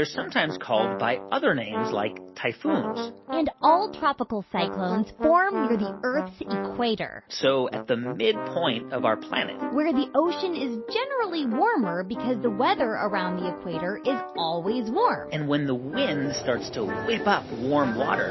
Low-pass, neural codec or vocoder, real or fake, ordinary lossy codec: 7.2 kHz; none; real; MP3, 24 kbps